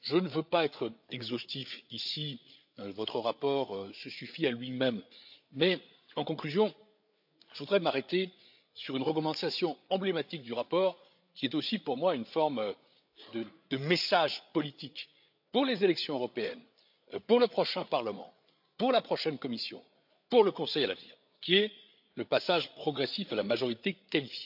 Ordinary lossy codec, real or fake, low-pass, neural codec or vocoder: AAC, 48 kbps; fake; 5.4 kHz; codec, 16 kHz, 8 kbps, FreqCodec, larger model